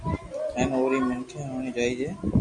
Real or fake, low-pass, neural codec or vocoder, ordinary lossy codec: real; 10.8 kHz; none; MP3, 64 kbps